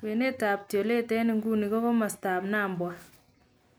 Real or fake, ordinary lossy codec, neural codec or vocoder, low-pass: real; none; none; none